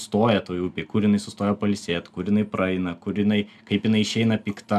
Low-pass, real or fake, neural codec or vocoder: 14.4 kHz; real; none